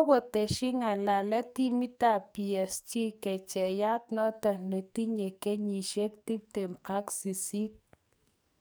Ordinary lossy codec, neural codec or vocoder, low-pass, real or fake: none; codec, 44.1 kHz, 2.6 kbps, SNAC; none; fake